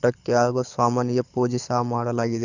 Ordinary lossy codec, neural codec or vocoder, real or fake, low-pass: none; codec, 24 kHz, 6 kbps, HILCodec; fake; 7.2 kHz